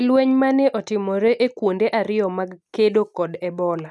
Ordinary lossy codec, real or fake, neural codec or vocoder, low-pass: none; real; none; none